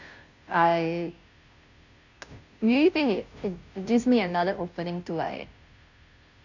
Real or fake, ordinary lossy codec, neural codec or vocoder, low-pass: fake; none; codec, 16 kHz, 0.5 kbps, FunCodec, trained on Chinese and English, 25 frames a second; 7.2 kHz